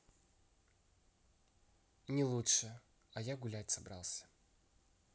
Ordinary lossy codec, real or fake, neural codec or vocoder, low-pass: none; real; none; none